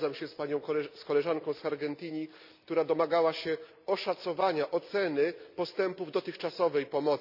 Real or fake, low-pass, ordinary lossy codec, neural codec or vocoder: real; 5.4 kHz; none; none